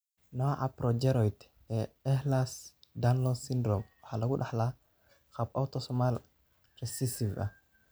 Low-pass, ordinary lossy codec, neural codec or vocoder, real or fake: none; none; none; real